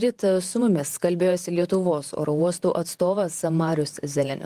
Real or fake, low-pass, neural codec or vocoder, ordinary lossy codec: fake; 14.4 kHz; vocoder, 48 kHz, 128 mel bands, Vocos; Opus, 24 kbps